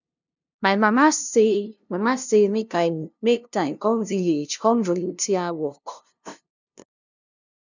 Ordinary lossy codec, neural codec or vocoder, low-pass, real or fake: none; codec, 16 kHz, 0.5 kbps, FunCodec, trained on LibriTTS, 25 frames a second; 7.2 kHz; fake